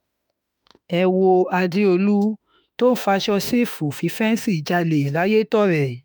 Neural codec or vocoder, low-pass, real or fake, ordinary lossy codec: autoencoder, 48 kHz, 32 numbers a frame, DAC-VAE, trained on Japanese speech; none; fake; none